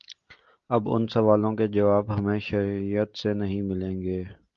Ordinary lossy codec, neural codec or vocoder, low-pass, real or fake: Opus, 32 kbps; none; 7.2 kHz; real